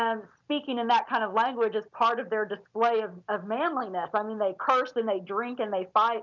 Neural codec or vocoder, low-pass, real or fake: none; 7.2 kHz; real